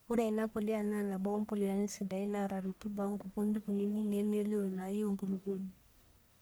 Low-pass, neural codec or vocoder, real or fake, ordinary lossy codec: none; codec, 44.1 kHz, 1.7 kbps, Pupu-Codec; fake; none